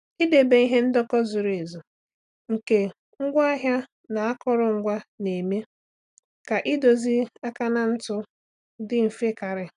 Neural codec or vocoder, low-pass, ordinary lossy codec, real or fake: none; 10.8 kHz; none; real